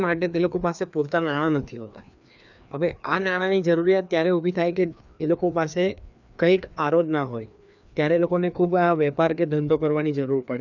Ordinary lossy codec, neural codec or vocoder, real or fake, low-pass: none; codec, 16 kHz, 2 kbps, FreqCodec, larger model; fake; 7.2 kHz